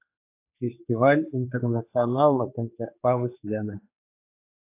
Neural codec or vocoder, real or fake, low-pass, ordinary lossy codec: codec, 16 kHz, 2 kbps, X-Codec, HuBERT features, trained on general audio; fake; 3.6 kHz; AAC, 24 kbps